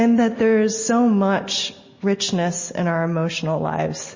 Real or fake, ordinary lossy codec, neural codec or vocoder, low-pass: real; MP3, 32 kbps; none; 7.2 kHz